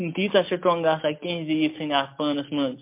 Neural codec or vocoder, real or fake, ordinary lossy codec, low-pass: none; real; MP3, 32 kbps; 3.6 kHz